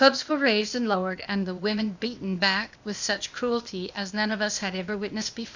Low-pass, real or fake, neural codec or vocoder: 7.2 kHz; fake; codec, 16 kHz, 0.8 kbps, ZipCodec